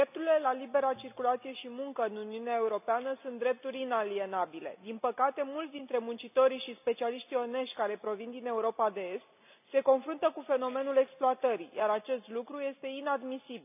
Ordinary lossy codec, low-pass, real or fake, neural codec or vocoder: none; 3.6 kHz; real; none